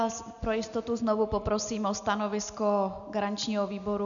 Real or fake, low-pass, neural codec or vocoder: real; 7.2 kHz; none